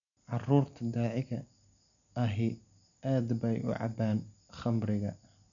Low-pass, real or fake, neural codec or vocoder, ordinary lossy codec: 7.2 kHz; real; none; none